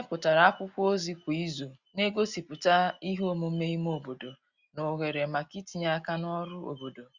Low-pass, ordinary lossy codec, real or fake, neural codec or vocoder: 7.2 kHz; Opus, 64 kbps; real; none